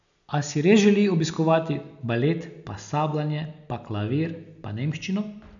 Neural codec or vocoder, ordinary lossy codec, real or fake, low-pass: none; none; real; 7.2 kHz